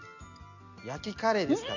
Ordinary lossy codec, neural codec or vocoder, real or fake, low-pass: none; none; real; 7.2 kHz